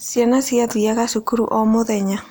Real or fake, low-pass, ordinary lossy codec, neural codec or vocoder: real; none; none; none